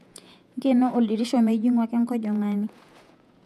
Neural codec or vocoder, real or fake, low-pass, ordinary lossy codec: vocoder, 48 kHz, 128 mel bands, Vocos; fake; 14.4 kHz; none